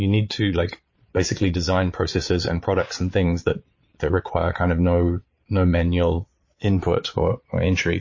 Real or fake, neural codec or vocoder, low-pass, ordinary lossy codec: fake; vocoder, 22.05 kHz, 80 mel bands, Vocos; 7.2 kHz; MP3, 32 kbps